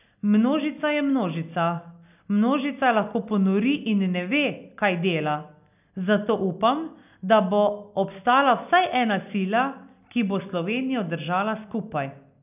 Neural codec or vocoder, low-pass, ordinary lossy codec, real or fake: none; 3.6 kHz; none; real